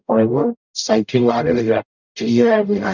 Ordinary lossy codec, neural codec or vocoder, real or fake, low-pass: none; codec, 44.1 kHz, 0.9 kbps, DAC; fake; 7.2 kHz